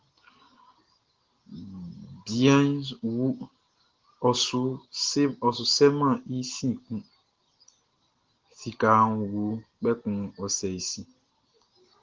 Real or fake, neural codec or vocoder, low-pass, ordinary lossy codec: real; none; 7.2 kHz; Opus, 16 kbps